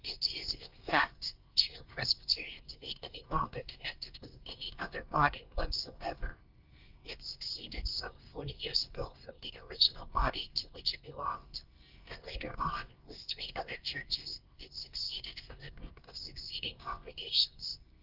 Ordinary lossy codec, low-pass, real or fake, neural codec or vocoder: Opus, 24 kbps; 5.4 kHz; fake; codec, 24 kHz, 1 kbps, SNAC